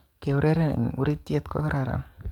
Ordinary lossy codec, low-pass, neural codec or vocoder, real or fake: MP3, 96 kbps; 19.8 kHz; codec, 44.1 kHz, 7.8 kbps, DAC; fake